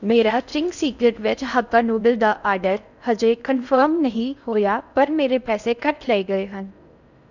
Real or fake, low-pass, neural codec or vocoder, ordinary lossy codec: fake; 7.2 kHz; codec, 16 kHz in and 24 kHz out, 0.6 kbps, FocalCodec, streaming, 4096 codes; none